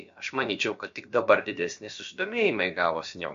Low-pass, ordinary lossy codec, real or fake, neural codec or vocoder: 7.2 kHz; MP3, 48 kbps; fake; codec, 16 kHz, about 1 kbps, DyCAST, with the encoder's durations